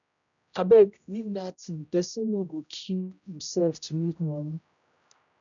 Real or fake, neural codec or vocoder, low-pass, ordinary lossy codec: fake; codec, 16 kHz, 0.5 kbps, X-Codec, HuBERT features, trained on general audio; 7.2 kHz; none